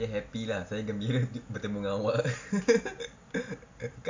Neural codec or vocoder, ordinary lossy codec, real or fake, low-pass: none; AAC, 48 kbps; real; 7.2 kHz